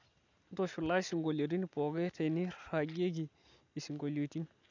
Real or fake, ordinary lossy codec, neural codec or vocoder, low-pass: real; none; none; 7.2 kHz